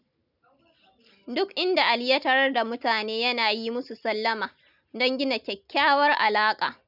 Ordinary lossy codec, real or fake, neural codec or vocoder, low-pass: none; real; none; 5.4 kHz